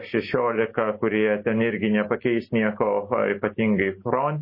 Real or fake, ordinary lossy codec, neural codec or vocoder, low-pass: real; MP3, 24 kbps; none; 5.4 kHz